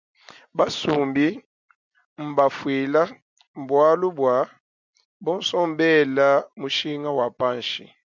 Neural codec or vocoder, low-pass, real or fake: none; 7.2 kHz; real